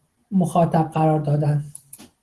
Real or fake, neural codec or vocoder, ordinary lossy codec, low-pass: real; none; Opus, 16 kbps; 10.8 kHz